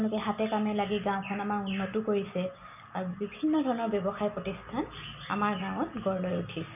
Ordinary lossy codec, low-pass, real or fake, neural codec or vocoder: none; 3.6 kHz; real; none